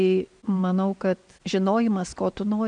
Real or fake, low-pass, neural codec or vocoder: real; 9.9 kHz; none